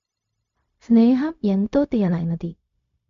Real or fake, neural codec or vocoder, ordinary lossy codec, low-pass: fake; codec, 16 kHz, 0.4 kbps, LongCat-Audio-Codec; AAC, 96 kbps; 7.2 kHz